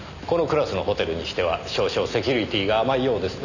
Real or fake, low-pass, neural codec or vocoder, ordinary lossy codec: real; 7.2 kHz; none; none